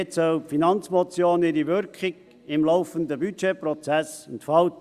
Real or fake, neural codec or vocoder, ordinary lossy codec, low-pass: real; none; Opus, 64 kbps; 14.4 kHz